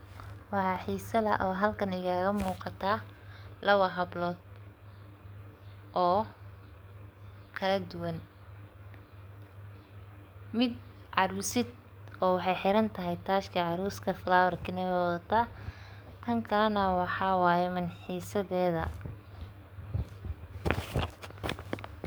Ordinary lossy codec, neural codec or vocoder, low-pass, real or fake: none; codec, 44.1 kHz, 7.8 kbps, DAC; none; fake